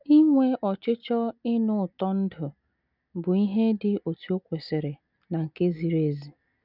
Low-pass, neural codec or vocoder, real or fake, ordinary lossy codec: 5.4 kHz; none; real; none